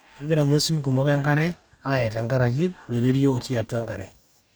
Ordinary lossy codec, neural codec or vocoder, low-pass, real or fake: none; codec, 44.1 kHz, 2.6 kbps, DAC; none; fake